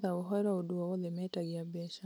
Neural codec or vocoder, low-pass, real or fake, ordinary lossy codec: none; none; real; none